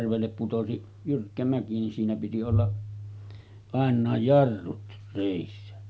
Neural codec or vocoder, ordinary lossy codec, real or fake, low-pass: none; none; real; none